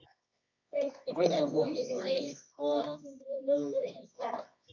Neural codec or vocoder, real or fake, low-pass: codec, 24 kHz, 0.9 kbps, WavTokenizer, medium music audio release; fake; 7.2 kHz